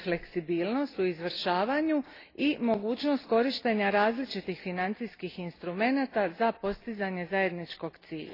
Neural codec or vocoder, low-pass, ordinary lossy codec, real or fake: none; 5.4 kHz; AAC, 24 kbps; real